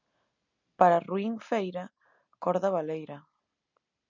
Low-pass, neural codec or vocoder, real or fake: 7.2 kHz; none; real